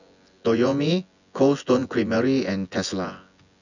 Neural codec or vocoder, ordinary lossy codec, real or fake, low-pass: vocoder, 24 kHz, 100 mel bands, Vocos; none; fake; 7.2 kHz